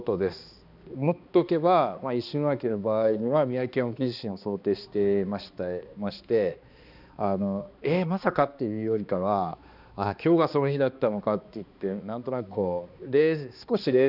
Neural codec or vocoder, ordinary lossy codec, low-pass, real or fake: codec, 16 kHz, 2 kbps, X-Codec, HuBERT features, trained on balanced general audio; none; 5.4 kHz; fake